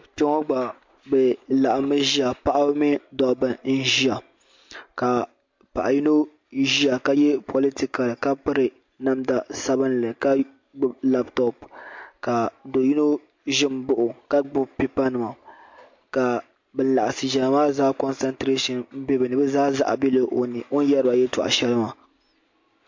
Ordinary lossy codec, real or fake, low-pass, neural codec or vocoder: MP3, 48 kbps; real; 7.2 kHz; none